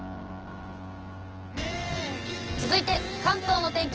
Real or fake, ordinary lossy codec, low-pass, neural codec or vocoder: fake; Opus, 16 kbps; 7.2 kHz; vocoder, 24 kHz, 100 mel bands, Vocos